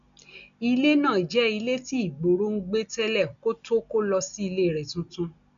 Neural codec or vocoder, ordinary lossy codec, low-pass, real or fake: none; none; 7.2 kHz; real